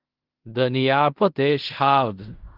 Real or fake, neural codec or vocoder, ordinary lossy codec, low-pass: fake; codec, 16 kHz in and 24 kHz out, 0.4 kbps, LongCat-Audio-Codec, fine tuned four codebook decoder; Opus, 24 kbps; 5.4 kHz